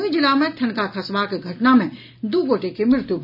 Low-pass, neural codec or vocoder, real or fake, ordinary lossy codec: 5.4 kHz; none; real; none